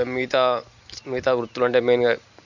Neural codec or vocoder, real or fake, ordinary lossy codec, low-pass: none; real; none; 7.2 kHz